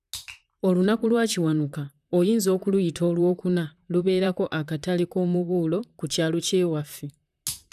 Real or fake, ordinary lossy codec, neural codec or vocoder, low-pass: fake; none; vocoder, 44.1 kHz, 128 mel bands, Pupu-Vocoder; 14.4 kHz